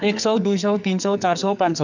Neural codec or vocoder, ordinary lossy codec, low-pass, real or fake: codec, 44.1 kHz, 2.6 kbps, SNAC; none; 7.2 kHz; fake